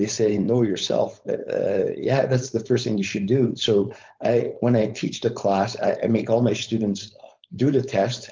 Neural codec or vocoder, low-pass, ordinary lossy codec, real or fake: codec, 16 kHz, 4.8 kbps, FACodec; 7.2 kHz; Opus, 32 kbps; fake